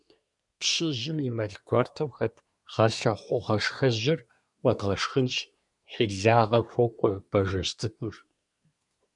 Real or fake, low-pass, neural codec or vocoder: fake; 10.8 kHz; codec, 24 kHz, 1 kbps, SNAC